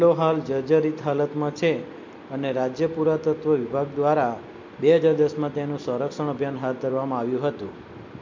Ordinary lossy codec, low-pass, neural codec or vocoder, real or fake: MP3, 48 kbps; 7.2 kHz; none; real